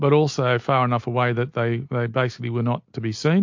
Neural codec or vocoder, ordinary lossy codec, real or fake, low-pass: none; MP3, 48 kbps; real; 7.2 kHz